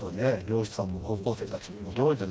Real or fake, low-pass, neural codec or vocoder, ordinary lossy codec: fake; none; codec, 16 kHz, 1 kbps, FreqCodec, smaller model; none